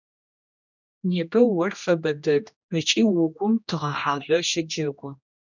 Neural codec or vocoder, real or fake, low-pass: codec, 16 kHz, 1 kbps, X-Codec, HuBERT features, trained on general audio; fake; 7.2 kHz